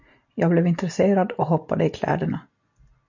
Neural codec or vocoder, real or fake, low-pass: none; real; 7.2 kHz